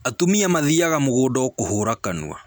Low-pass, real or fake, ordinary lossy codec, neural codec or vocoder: none; real; none; none